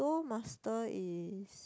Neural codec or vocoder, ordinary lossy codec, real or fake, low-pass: none; none; real; none